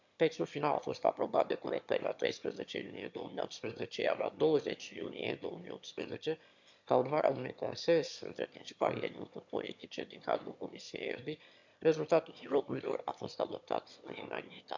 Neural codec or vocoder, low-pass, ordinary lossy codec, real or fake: autoencoder, 22.05 kHz, a latent of 192 numbers a frame, VITS, trained on one speaker; 7.2 kHz; MP3, 64 kbps; fake